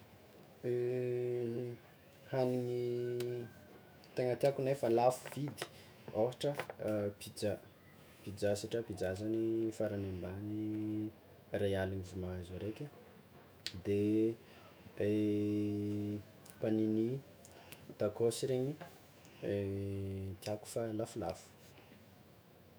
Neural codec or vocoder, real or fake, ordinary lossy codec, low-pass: autoencoder, 48 kHz, 128 numbers a frame, DAC-VAE, trained on Japanese speech; fake; none; none